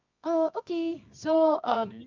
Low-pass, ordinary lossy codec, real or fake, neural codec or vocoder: 7.2 kHz; MP3, 64 kbps; fake; codec, 24 kHz, 0.9 kbps, WavTokenizer, medium music audio release